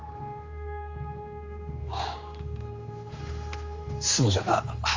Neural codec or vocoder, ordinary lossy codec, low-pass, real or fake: codec, 16 kHz, 2 kbps, X-Codec, HuBERT features, trained on general audio; Opus, 32 kbps; 7.2 kHz; fake